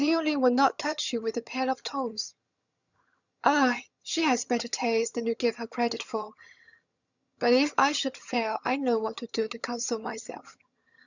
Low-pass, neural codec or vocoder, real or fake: 7.2 kHz; vocoder, 22.05 kHz, 80 mel bands, HiFi-GAN; fake